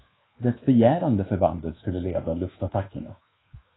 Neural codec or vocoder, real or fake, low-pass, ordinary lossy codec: codec, 24 kHz, 1.2 kbps, DualCodec; fake; 7.2 kHz; AAC, 16 kbps